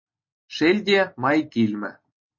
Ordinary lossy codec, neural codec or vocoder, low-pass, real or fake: MP3, 32 kbps; none; 7.2 kHz; real